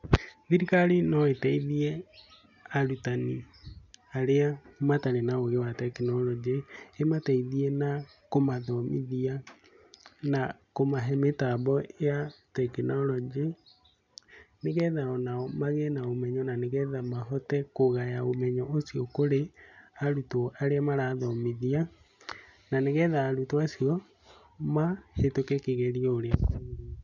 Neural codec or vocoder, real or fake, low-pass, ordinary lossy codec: none; real; 7.2 kHz; none